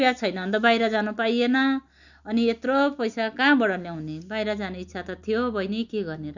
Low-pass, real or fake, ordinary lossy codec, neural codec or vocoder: 7.2 kHz; real; none; none